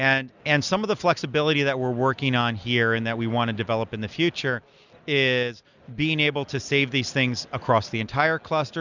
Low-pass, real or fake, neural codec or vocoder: 7.2 kHz; real; none